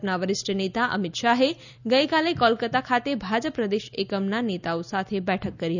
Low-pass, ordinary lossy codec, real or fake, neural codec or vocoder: 7.2 kHz; none; real; none